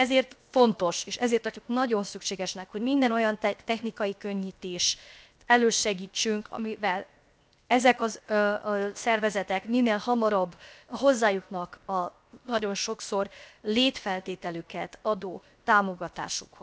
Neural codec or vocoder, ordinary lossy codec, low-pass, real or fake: codec, 16 kHz, 0.7 kbps, FocalCodec; none; none; fake